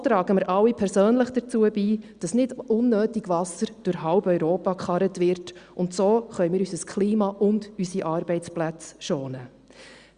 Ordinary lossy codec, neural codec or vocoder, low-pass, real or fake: Opus, 64 kbps; none; 9.9 kHz; real